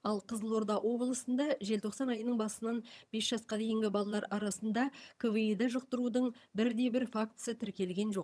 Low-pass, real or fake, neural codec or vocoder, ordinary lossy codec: none; fake; vocoder, 22.05 kHz, 80 mel bands, HiFi-GAN; none